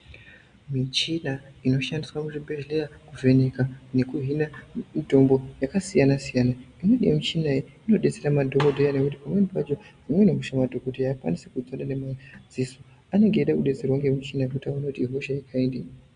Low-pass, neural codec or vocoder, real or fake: 9.9 kHz; none; real